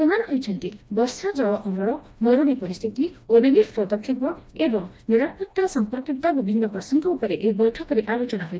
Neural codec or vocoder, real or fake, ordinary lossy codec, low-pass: codec, 16 kHz, 1 kbps, FreqCodec, smaller model; fake; none; none